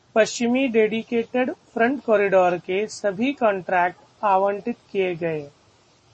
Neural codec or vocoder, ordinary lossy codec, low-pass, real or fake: none; MP3, 32 kbps; 9.9 kHz; real